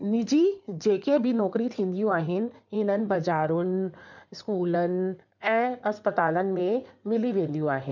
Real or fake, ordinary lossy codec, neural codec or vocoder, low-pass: fake; none; codec, 16 kHz in and 24 kHz out, 2.2 kbps, FireRedTTS-2 codec; 7.2 kHz